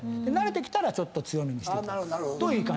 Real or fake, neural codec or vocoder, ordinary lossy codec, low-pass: real; none; none; none